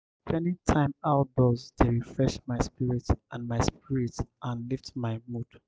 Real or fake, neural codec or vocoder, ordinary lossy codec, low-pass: real; none; none; none